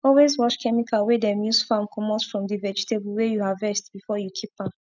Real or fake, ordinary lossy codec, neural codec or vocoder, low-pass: real; none; none; 7.2 kHz